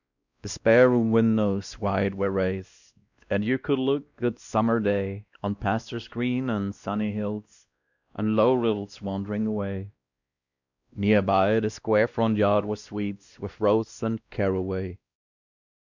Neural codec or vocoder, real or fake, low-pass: codec, 16 kHz, 1 kbps, X-Codec, WavLM features, trained on Multilingual LibriSpeech; fake; 7.2 kHz